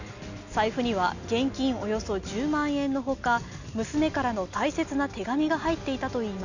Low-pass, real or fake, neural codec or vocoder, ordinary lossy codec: 7.2 kHz; real; none; none